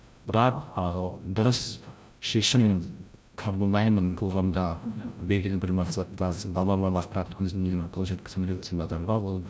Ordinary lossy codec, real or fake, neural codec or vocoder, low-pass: none; fake; codec, 16 kHz, 0.5 kbps, FreqCodec, larger model; none